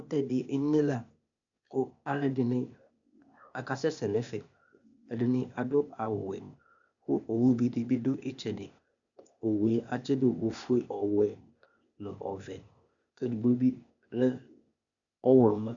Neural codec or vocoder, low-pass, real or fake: codec, 16 kHz, 0.8 kbps, ZipCodec; 7.2 kHz; fake